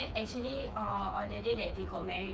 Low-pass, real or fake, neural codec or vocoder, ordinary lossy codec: none; fake; codec, 16 kHz, 4 kbps, FreqCodec, smaller model; none